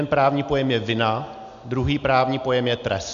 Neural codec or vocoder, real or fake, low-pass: none; real; 7.2 kHz